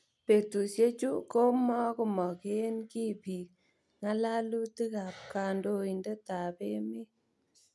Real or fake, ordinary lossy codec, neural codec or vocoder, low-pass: real; none; none; none